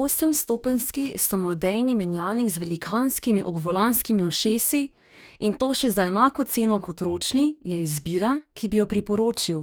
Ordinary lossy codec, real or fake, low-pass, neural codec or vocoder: none; fake; none; codec, 44.1 kHz, 2.6 kbps, DAC